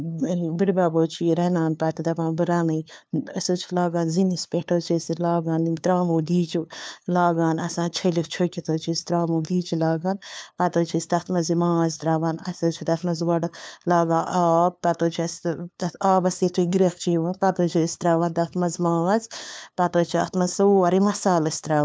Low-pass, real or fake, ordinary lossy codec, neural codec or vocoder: none; fake; none; codec, 16 kHz, 2 kbps, FunCodec, trained on LibriTTS, 25 frames a second